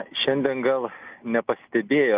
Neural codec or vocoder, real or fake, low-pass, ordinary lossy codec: none; real; 3.6 kHz; Opus, 24 kbps